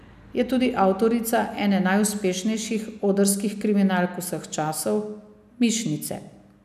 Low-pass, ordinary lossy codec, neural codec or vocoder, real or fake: 14.4 kHz; none; none; real